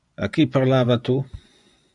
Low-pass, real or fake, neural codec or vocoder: 10.8 kHz; fake; vocoder, 24 kHz, 100 mel bands, Vocos